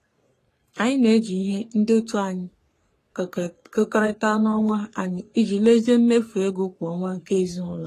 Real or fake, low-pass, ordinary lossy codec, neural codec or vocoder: fake; 14.4 kHz; AAC, 48 kbps; codec, 44.1 kHz, 3.4 kbps, Pupu-Codec